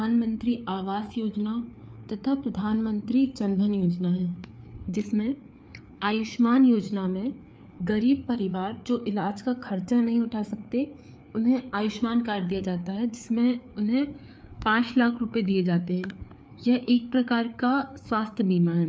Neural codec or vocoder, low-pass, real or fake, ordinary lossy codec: codec, 16 kHz, 4 kbps, FreqCodec, larger model; none; fake; none